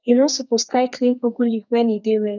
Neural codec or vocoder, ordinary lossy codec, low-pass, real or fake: codec, 32 kHz, 1.9 kbps, SNAC; none; 7.2 kHz; fake